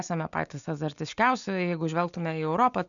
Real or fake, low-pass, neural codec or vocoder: real; 7.2 kHz; none